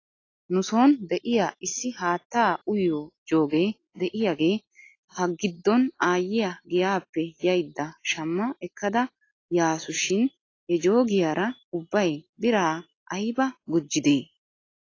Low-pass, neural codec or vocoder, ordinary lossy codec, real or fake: 7.2 kHz; none; AAC, 32 kbps; real